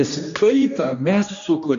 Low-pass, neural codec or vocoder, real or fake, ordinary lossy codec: 7.2 kHz; codec, 16 kHz, 1 kbps, X-Codec, HuBERT features, trained on general audio; fake; AAC, 64 kbps